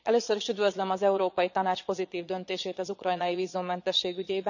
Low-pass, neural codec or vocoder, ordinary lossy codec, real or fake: 7.2 kHz; vocoder, 22.05 kHz, 80 mel bands, Vocos; none; fake